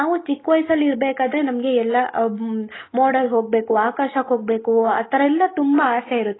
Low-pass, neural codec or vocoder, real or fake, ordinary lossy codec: 7.2 kHz; none; real; AAC, 16 kbps